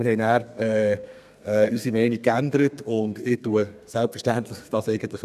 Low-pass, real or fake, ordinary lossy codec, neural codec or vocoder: 14.4 kHz; fake; none; codec, 32 kHz, 1.9 kbps, SNAC